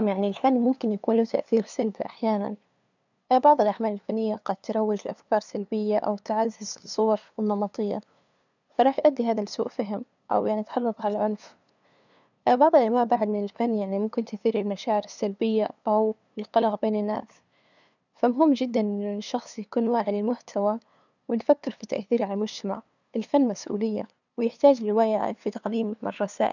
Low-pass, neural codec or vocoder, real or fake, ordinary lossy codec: 7.2 kHz; codec, 16 kHz, 2 kbps, FunCodec, trained on LibriTTS, 25 frames a second; fake; none